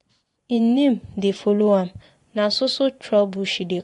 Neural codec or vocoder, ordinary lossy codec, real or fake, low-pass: vocoder, 24 kHz, 100 mel bands, Vocos; AAC, 48 kbps; fake; 10.8 kHz